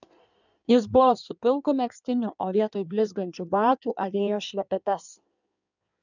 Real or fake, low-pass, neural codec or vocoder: fake; 7.2 kHz; codec, 16 kHz in and 24 kHz out, 1.1 kbps, FireRedTTS-2 codec